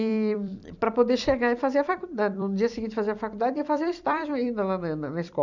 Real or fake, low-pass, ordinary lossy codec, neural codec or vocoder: fake; 7.2 kHz; none; vocoder, 44.1 kHz, 128 mel bands every 256 samples, BigVGAN v2